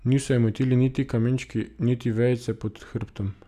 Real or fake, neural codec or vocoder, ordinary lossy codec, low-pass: real; none; none; 14.4 kHz